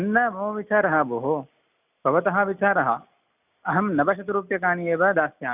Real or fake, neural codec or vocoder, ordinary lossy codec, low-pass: real; none; none; 3.6 kHz